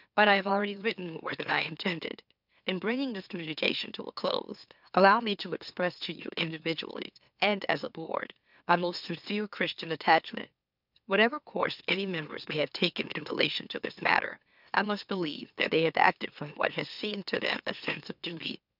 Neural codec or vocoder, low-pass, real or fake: autoencoder, 44.1 kHz, a latent of 192 numbers a frame, MeloTTS; 5.4 kHz; fake